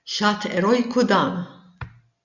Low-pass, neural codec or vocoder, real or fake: 7.2 kHz; none; real